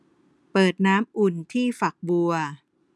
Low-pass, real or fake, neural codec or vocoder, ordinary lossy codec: none; real; none; none